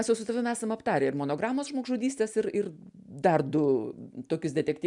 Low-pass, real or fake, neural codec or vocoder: 10.8 kHz; real; none